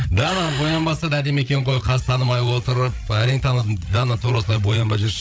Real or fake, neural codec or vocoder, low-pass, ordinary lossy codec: fake; codec, 16 kHz, 8 kbps, FreqCodec, larger model; none; none